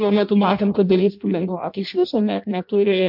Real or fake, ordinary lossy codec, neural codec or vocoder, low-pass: fake; MP3, 48 kbps; codec, 16 kHz in and 24 kHz out, 0.6 kbps, FireRedTTS-2 codec; 5.4 kHz